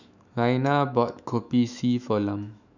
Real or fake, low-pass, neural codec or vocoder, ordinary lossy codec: fake; 7.2 kHz; autoencoder, 48 kHz, 128 numbers a frame, DAC-VAE, trained on Japanese speech; none